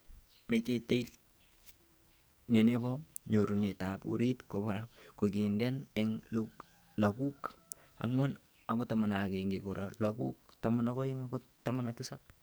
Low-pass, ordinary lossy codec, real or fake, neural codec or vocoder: none; none; fake; codec, 44.1 kHz, 2.6 kbps, SNAC